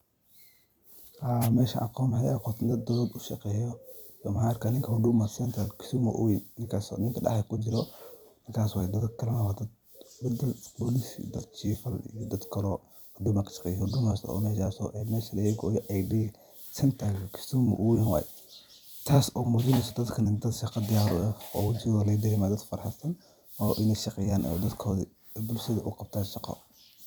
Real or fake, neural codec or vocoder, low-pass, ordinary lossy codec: fake; vocoder, 44.1 kHz, 128 mel bands every 256 samples, BigVGAN v2; none; none